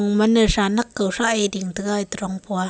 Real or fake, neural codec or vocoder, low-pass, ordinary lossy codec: real; none; none; none